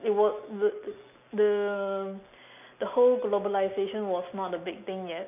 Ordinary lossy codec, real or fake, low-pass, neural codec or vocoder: none; real; 3.6 kHz; none